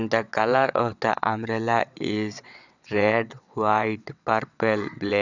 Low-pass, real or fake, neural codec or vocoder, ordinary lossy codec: 7.2 kHz; fake; vocoder, 22.05 kHz, 80 mel bands, WaveNeXt; none